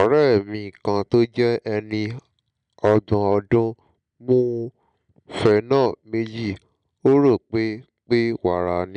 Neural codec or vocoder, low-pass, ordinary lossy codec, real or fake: none; 9.9 kHz; none; real